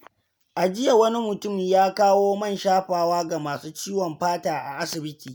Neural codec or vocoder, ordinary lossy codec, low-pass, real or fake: none; none; none; real